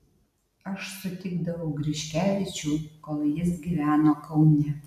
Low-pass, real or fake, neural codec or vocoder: 14.4 kHz; real; none